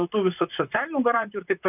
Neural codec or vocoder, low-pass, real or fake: vocoder, 44.1 kHz, 128 mel bands, Pupu-Vocoder; 3.6 kHz; fake